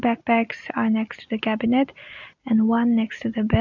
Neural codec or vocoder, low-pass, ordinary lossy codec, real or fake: none; 7.2 kHz; none; real